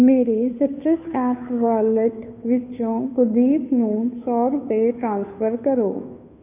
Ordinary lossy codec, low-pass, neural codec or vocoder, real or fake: none; 3.6 kHz; codec, 16 kHz, 2 kbps, FunCodec, trained on Chinese and English, 25 frames a second; fake